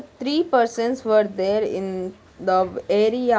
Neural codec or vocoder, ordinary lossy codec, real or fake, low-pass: none; none; real; none